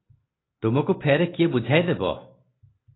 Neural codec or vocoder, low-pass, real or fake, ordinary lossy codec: none; 7.2 kHz; real; AAC, 16 kbps